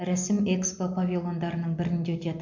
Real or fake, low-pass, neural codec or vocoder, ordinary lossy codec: real; 7.2 kHz; none; MP3, 48 kbps